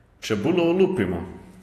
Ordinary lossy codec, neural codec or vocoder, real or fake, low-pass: AAC, 48 kbps; vocoder, 48 kHz, 128 mel bands, Vocos; fake; 14.4 kHz